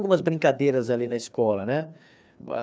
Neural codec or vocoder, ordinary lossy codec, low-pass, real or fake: codec, 16 kHz, 2 kbps, FreqCodec, larger model; none; none; fake